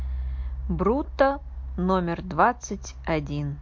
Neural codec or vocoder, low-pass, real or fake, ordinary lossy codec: none; 7.2 kHz; real; MP3, 48 kbps